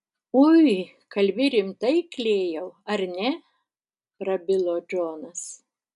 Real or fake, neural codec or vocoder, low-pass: real; none; 10.8 kHz